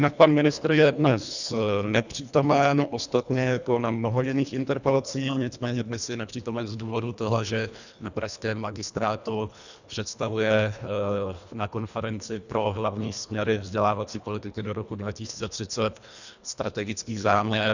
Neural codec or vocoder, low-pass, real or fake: codec, 24 kHz, 1.5 kbps, HILCodec; 7.2 kHz; fake